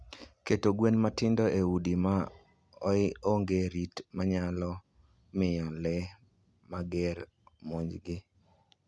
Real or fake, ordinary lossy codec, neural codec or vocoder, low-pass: real; none; none; none